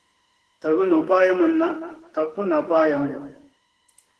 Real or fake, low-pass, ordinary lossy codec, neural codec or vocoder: fake; 10.8 kHz; Opus, 16 kbps; autoencoder, 48 kHz, 32 numbers a frame, DAC-VAE, trained on Japanese speech